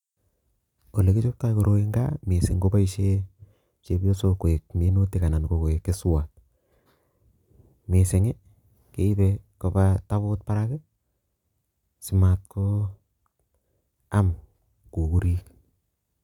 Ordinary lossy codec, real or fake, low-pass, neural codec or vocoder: none; real; 19.8 kHz; none